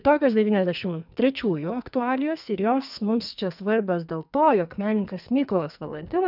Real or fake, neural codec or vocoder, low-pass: fake; codec, 44.1 kHz, 2.6 kbps, SNAC; 5.4 kHz